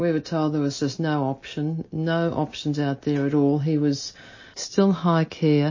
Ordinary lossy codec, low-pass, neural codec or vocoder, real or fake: MP3, 32 kbps; 7.2 kHz; none; real